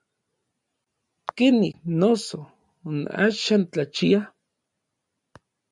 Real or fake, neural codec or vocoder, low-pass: real; none; 10.8 kHz